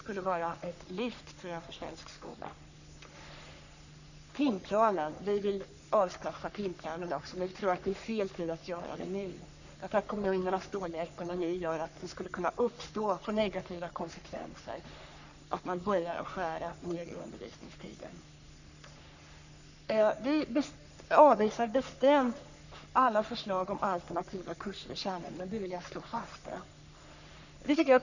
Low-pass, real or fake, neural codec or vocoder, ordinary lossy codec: 7.2 kHz; fake; codec, 44.1 kHz, 3.4 kbps, Pupu-Codec; none